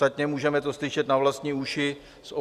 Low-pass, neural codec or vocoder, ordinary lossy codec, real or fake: 14.4 kHz; none; Opus, 64 kbps; real